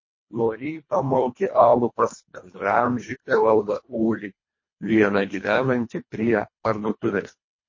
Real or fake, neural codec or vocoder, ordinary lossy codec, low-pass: fake; codec, 24 kHz, 1.5 kbps, HILCodec; MP3, 32 kbps; 7.2 kHz